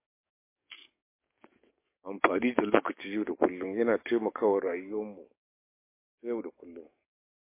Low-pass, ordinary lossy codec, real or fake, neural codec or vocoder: 3.6 kHz; MP3, 24 kbps; fake; codec, 44.1 kHz, 7.8 kbps, DAC